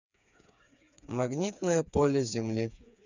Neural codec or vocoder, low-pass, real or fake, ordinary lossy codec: codec, 16 kHz, 4 kbps, FreqCodec, smaller model; 7.2 kHz; fake; none